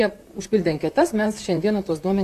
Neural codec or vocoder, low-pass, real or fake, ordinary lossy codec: vocoder, 44.1 kHz, 128 mel bands, Pupu-Vocoder; 14.4 kHz; fake; MP3, 96 kbps